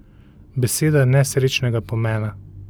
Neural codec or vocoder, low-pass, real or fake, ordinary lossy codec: none; none; real; none